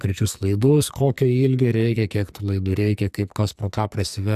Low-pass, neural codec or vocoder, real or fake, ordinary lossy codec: 14.4 kHz; codec, 44.1 kHz, 2.6 kbps, SNAC; fake; AAC, 96 kbps